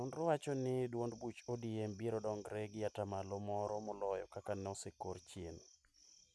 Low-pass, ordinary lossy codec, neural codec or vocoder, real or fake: none; none; none; real